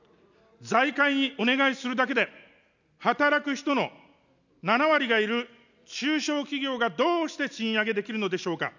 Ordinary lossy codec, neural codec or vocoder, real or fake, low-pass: none; none; real; 7.2 kHz